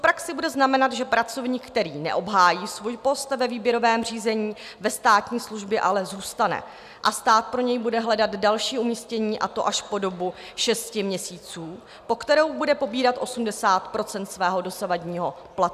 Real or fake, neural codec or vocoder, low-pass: real; none; 14.4 kHz